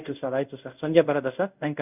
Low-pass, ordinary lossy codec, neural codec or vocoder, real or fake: 3.6 kHz; none; codec, 24 kHz, 0.5 kbps, DualCodec; fake